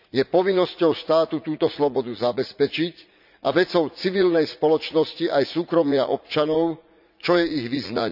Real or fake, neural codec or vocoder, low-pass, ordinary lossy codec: fake; vocoder, 44.1 kHz, 80 mel bands, Vocos; 5.4 kHz; none